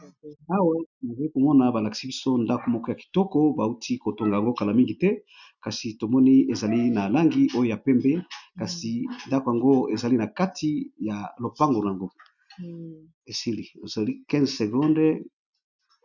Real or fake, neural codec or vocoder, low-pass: real; none; 7.2 kHz